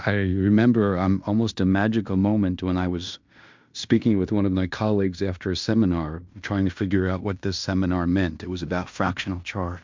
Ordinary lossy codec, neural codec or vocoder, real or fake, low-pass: MP3, 64 kbps; codec, 16 kHz in and 24 kHz out, 0.9 kbps, LongCat-Audio-Codec, fine tuned four codebook decoder; fake; 7.2 kHz